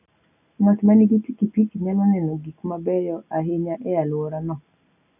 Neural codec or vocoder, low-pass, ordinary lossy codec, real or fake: none; 3.6 kHz; none; real